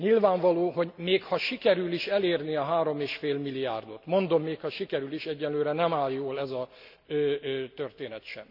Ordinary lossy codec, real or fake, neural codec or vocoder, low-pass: none; real; none; 5.4 kHz